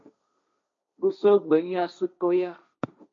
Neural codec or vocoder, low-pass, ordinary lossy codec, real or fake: codec, 16 kHz, 1.1 kbps, Voila-Tokenizer; 7.2 kHz; MP3, 48 kbps; fake